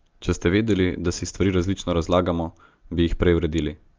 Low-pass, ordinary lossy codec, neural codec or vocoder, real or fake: 7.2 kHz; Opus, 24 kbps; none; real